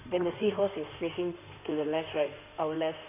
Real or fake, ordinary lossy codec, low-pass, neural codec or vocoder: fake; none; 3.6 kHz; codec, 16 kHz in and 24 kHz out, 2.2 kbps, FireRedTTS-2 codec